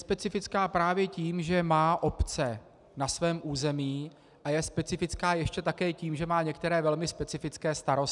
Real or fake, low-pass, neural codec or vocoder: real; 10.8 kHz; none